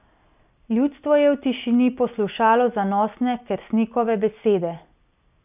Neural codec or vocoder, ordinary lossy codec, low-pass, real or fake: none; none; 3.6 kHz; real